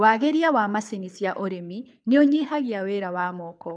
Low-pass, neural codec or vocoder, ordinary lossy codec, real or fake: 9.9 kHz; codec, 24 kHz, 6 kbps, HILCodec; none; fake